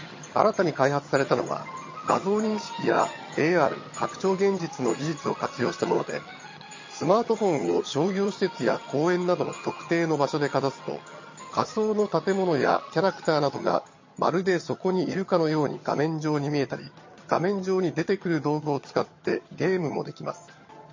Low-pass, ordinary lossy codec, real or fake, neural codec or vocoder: 7.2 kHz; MP3, 32 kbps; fake; vocoder, 22.05 kHz, 80 mel bands, HiFi-GAN